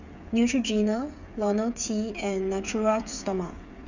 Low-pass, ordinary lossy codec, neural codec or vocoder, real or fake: 7.2 kHz; none; codec, 16 kHz, 8 kbps, FreqCodec, smaller model; fake